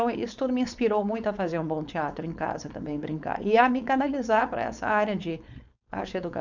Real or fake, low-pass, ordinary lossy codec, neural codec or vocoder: fake; 7.2 kHz; none; codec, 16 kHz, 4.8 kbps, FACodec